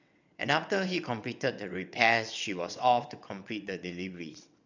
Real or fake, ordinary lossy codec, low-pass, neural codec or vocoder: fake; none; 7.2 kHz; vocoder, 22.05 kHz, 80 mel bands, WaveNeXt